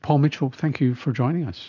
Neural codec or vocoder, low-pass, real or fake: none; 7.2 kHz; real